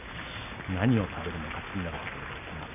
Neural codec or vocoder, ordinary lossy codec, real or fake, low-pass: none; none; real; 3.6 kHz